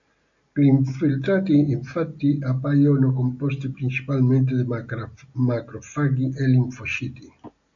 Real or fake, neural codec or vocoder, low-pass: real; none; 7.2 kHz